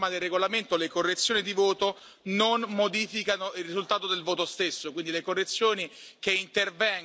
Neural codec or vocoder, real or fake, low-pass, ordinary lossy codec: none; real; none; none